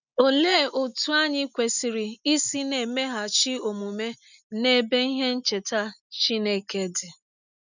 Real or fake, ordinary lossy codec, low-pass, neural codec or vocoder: real; none; 7.2 kHz; none